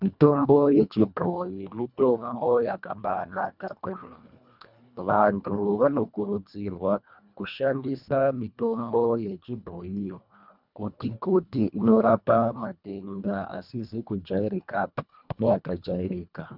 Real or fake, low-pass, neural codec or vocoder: fake; 5.4 kHz; codec, 24 kHz, 1.5 kbps, HILCodec